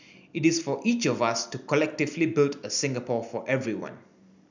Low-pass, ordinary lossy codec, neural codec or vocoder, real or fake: 7.2 kHz; none; none; real